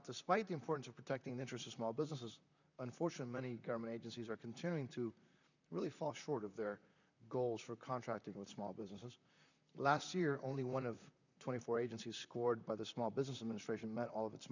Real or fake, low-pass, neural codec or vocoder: fake; 7.2 kHz; vocoder, 44.1 kHz, 128 mel bands, Pupu-Vocoder